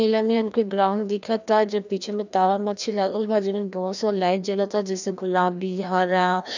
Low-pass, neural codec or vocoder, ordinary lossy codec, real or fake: 7.2 kHz; codec, 16 kHz, 1 kbps, FreqCodec, larger model; none; fake